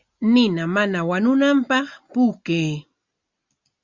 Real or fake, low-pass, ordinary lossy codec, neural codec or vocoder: real; 7.2 kHz; Opus, 64 kbps; none